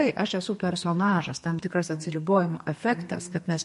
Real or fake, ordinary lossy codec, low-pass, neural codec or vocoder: fake; MP3, 48 kbps; 14.4 kHz; codec, 32 kHz, 1.9 kbps, SNAC